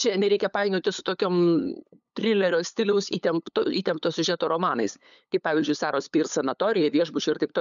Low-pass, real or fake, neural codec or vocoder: 7.2 kHz; fake; codec, 16 kHz, 8 kbps, FunCodec, trained on LibriTTS, 25 frames a second